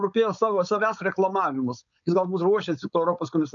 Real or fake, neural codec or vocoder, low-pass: fake; codec, 16 kHz, 4.8 kbps, FACodec; 7.2 kHz